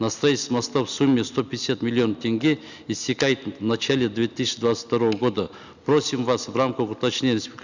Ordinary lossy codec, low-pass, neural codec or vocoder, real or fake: none; 7.2 kHz; none; real